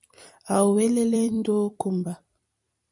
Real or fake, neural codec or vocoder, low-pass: fake; vocoder, 44.1 kHz, 128 mel bands every 256 samples, BigVGAN v2; 10.8 kHz